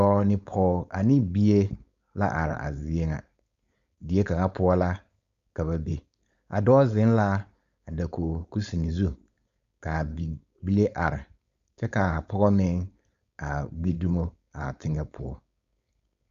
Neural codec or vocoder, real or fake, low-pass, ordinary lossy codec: codec, 16 kHz, 4.8 kbps, FACodec; fake; 7.2 kHz; Opus, 64 kbps